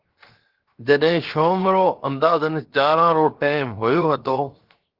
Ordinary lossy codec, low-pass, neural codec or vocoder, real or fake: Opus, 16 kbps; 5.4 kHz; codec, 16 kHz, 0.7 kbps, FocalCodec; fake